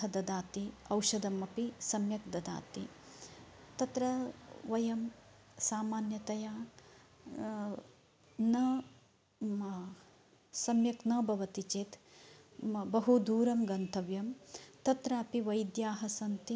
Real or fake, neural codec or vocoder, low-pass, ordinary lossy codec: real; none; none; none